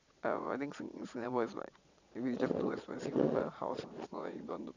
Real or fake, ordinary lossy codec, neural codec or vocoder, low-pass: fake; none; vocoder, 22.05 kHz, 80 mel bands, Vocos; 7.2 kHz